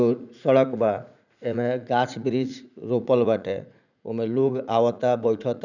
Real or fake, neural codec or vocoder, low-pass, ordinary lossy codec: fake; vocoder, 44.1 kHz, 80 mel bands, Vocos; 7.2 kHz; none